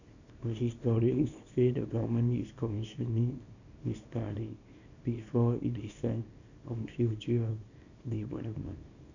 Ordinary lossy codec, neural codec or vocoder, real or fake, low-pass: none; codec, 24 kHz, 0.9 kbps, WavTokenizer, small release; fake; 7.2 kHz